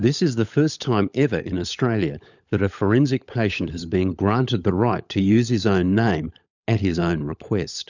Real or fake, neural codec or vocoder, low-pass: fake; codec, 16 kHz, 16 kbps, FunCodec, trained on LibriTTS, 50 frames a second; 7.2 kHz